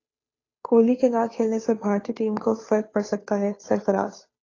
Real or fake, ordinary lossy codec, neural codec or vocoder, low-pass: fake; AAC, 32 kbps; codec, 16 kHz, 2 kbps, FunCodec, trained on Chinese and English, 25 frames a second; 7.2 kHz